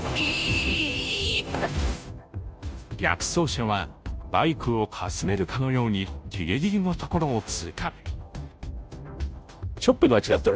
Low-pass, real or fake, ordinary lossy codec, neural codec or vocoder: none; fake; none; codec, 16 kHz, 0.5 kbps, FunCodec, trained on Chinese and English, 25 frames a second